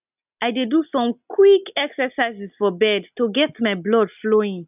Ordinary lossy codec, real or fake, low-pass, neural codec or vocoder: none; real; 3.6 kHz; none